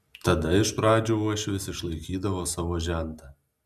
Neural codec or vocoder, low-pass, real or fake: none; 14.4 kHz; real